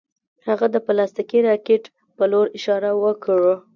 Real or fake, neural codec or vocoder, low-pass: real; none; 7.2 kHz